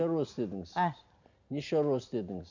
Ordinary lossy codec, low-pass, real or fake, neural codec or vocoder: none; 7.2 kHz; real; none